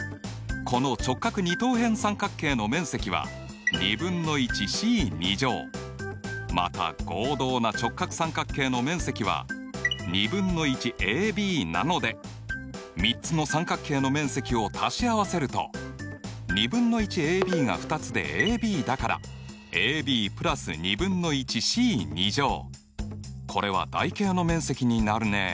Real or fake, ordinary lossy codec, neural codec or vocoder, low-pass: real; none; none; none